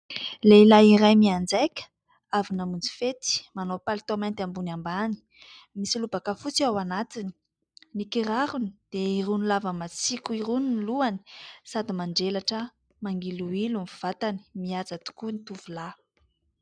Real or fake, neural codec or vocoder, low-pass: real; none; 9.9 kHz